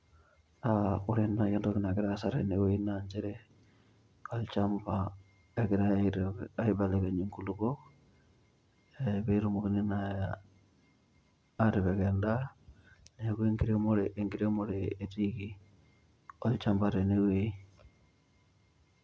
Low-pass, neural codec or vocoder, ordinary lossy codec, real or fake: none; none; none; real